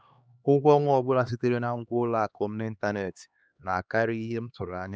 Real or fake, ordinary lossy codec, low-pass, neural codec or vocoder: fake; none; none; codec, 16 kHz, 2 kbps, X-Codec, HuBERT features, trained on LibriSpeech